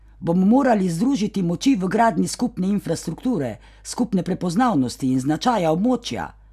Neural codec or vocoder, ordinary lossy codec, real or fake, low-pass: none; none; real; 14.4 kHz